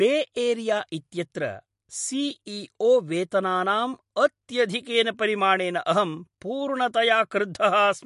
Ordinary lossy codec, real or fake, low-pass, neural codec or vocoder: MP3, 48 kbps; real; 14.4 kHz; none